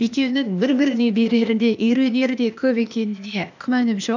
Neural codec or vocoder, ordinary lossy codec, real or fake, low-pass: codec, 16 kHz, 0.8 kbps, ZipCodec; none; fake; 7.2 kHz